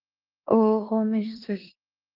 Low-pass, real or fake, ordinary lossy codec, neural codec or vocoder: 5.4 kHz; fake; Opus, 32 kbps; codec, 16 kHz in and 24 kHz out, 0.9 kbps, LongCat-Audio-Codec, four codebook decoder